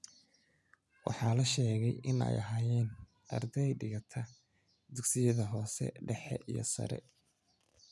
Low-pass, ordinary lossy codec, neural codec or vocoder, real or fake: none; none; none; real